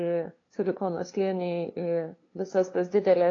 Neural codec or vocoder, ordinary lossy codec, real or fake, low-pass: codec, 16 kHz, 2 kbps, FunCodec, trained on LibriTTS, 25 frames a second; AAC, 32 kbps; fake; 7.2 kHz